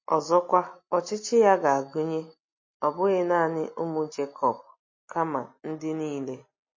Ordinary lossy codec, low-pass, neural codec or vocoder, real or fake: MP3, 32 kbps; 7.2 kHz; none; real